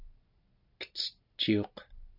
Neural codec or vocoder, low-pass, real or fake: none; 5.4 kHz; real